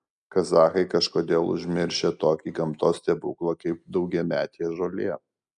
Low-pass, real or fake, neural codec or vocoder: 10.8 kHz; real; none